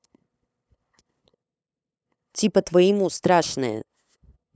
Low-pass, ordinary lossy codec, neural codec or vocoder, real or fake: none; none; codec, 16 kHz, 8 kbps, FunCodec, trained on LibriTTS, 25 frames a second; fake